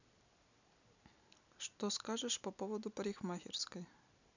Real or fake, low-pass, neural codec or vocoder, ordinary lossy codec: real; 7.2 kHz; none; none